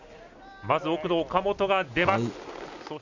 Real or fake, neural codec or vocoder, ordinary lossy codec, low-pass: real; none; none; 7.2 kHz